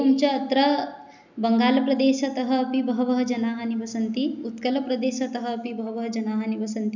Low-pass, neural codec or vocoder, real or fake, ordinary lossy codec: 7.2 kHz; none; real; none